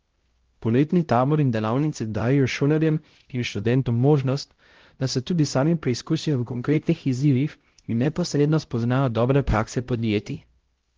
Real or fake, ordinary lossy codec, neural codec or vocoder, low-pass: fake; Opus, 16 kbps; codec, 16 kHz, 0.5 kbps, X-Codec, HuBERT features, trained on LibriSpeech; 7.2 kHz